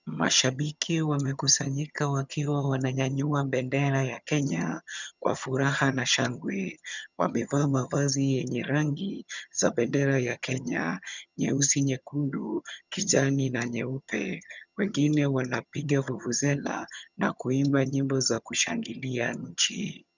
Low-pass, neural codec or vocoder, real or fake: 7.2 kHz; vocoder, 22.05 kHz, 80 mel bands, HiFi-GAN; fake